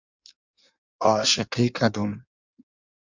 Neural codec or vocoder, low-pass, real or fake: codec, 16 kHz in and 24 kHz out, 1.1 kbps, FireRedTTS-2 codec; 7.2 kHz; fake